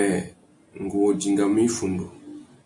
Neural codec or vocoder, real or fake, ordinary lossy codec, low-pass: none; real; MP3, 64 kbps; 10.8 kHz